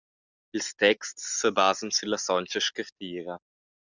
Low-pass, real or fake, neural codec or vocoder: 7.2 kHz; real; none